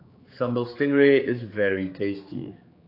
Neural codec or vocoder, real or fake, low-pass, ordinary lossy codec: codec, 16 kHz, 4 kbps, X-Codec, HuBERT features, trained on general audio; fake; 5.4 kHz; AAC, 32 kbps